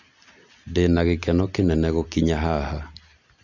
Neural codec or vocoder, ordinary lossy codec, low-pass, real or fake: none; none; 7.2 kHz; real